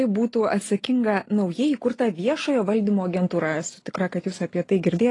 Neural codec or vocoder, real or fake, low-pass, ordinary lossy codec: none; real; 10.8 kHz; AAC, 32 kbps